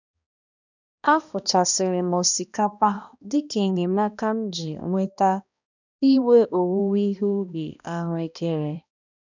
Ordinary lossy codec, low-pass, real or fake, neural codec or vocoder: none; 7.2 kHz; fake; codec, 16 kHz, 1 kbps, X-Codec, HuBERT features, trained on balanced general audio